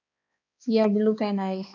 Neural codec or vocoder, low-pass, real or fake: codec, 16 kHz, 2 kbps, X-Codec, HuBERT features, trained on balanced general audio; 7.2 kHz; fake